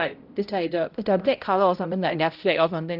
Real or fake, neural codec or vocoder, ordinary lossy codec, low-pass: fake; codec, 16 kHz, 0.5 kbps, X-Codec, HuBERT features, trained on balanced general audio; Opus, 24 kbps; 5.4 kHz